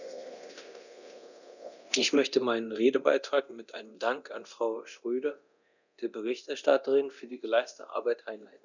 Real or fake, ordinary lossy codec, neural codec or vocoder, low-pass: fake; none; codec, 24 kHz, 0.9 kbps, DualCodec; 7.2 kHz